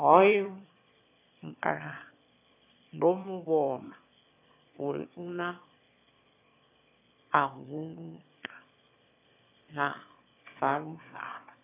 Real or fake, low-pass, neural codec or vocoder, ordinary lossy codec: fake; 3.6 kHz; autoencoder, 22.05 kHz, a latent of 192 numbers a frame, VITS, trained on one speaker; AAC, 24 kbps